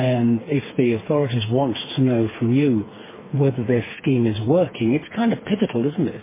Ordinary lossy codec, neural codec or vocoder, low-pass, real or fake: MP3, 16 kbps; codec, 16 kHz, 4 kbps, FreqCodec, smaller model; 3.6 kHz; fake